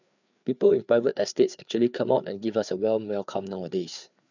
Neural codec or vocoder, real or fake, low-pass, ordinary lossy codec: codec, 16 kHz, 4 kbps, FreqCodec, larger model; fake; 7.2 kHz; none